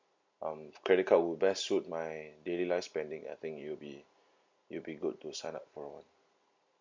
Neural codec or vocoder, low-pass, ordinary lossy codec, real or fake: none; 7.2 kHz; MP3, 64 kbps; real